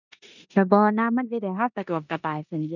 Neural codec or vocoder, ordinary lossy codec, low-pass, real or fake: codec, 16 kHz in and 24 kHz out, 0.9 kbps, LongCat-Audio-Codec, four codebook decoder; none; 7.2 kHz; fake